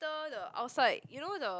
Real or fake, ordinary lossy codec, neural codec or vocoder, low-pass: real; none; none; none